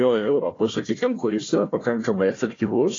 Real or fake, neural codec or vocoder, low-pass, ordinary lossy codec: fake; codec, 16 kHz, 1 kbps, FunCodec, trained on Chinese and English, 50 frames a second; 7.2 kHz; AAC, 32 kbps